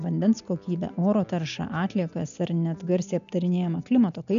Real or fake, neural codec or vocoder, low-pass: real; none; 7.2 kHz